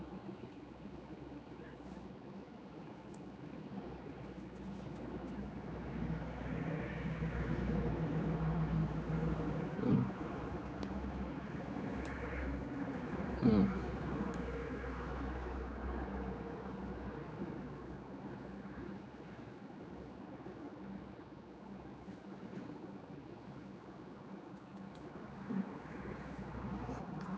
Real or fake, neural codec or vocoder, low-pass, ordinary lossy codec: fake; codec, 16 kHz, 4 kbps, X-Codec, HuBERT features, trained on general audio; none; none